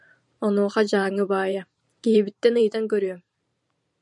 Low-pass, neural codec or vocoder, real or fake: 10.8 kHz; vocoder, 44.1 kHz, 128 mel bands every 512 samples, BigVGAN v2; fake